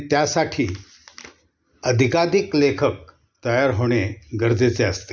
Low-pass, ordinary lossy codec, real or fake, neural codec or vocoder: none; none; real; none